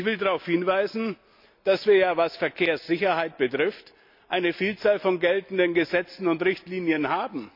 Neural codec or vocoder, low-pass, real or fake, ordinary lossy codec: none; 5.4 kHz; real; MP3, 48 kbps